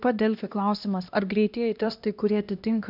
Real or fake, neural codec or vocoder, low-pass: fake; codec, 16 kHz, 1 kbps, X-Codec, HuBERT features, trained on LibriSpeech; 5.4 kHz